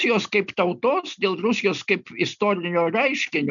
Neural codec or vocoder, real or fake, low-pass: none; real; 7.2 kHz